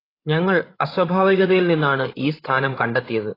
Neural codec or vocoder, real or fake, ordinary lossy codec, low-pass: codec, 16 kHz, 16 kbps, FreqCodec, larger model; fake; AAC, 24 kbps; 5.4 kHz